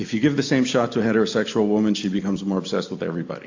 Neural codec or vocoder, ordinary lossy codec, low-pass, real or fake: none; AAC, 48 kbps; 7.2 kHz; real